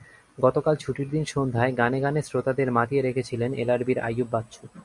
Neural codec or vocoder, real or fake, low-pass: none; real; 10.8 kHz